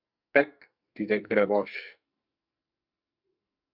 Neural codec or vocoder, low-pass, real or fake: codec, 44.1 kHz, 2.6 kbps, SNAC; 5.4 kHz; fake